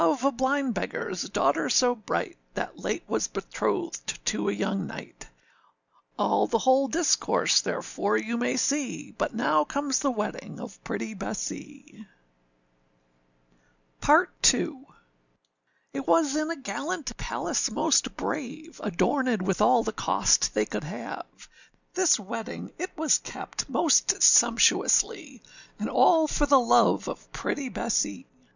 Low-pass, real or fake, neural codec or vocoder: 7.2 kHz; real; none